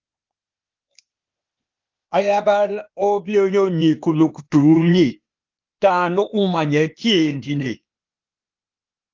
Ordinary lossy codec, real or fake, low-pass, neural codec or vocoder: Opus, 32 kbps; fake; 7.2 kHz; codec, 16 kHz, 0.8 kbps, ZipCodec